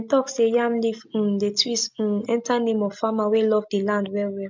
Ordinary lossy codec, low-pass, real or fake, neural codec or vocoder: MP3, 64 kbps; 7.2 kHz; real; none